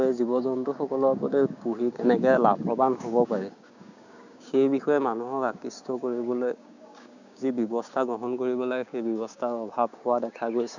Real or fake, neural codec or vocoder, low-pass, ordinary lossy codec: fake; codec, 16 kHz, 6 kbps, DAC; 7.2 kHz; none